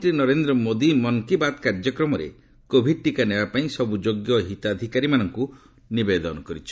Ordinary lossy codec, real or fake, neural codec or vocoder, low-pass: none; real; none; none